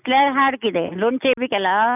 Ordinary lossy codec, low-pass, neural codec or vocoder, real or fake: none; 3.6 kHz; none; real